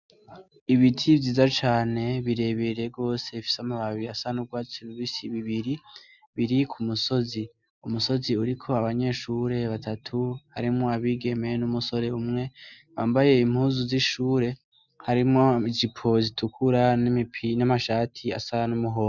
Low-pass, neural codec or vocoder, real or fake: 7.2 kHz; none; real